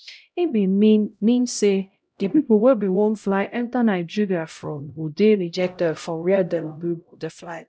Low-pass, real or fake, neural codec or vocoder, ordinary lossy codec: none; fake; codec, 16 kHz, 0.5 kbps, X-Codec, HuBERT features, trained on LibriSpeech; none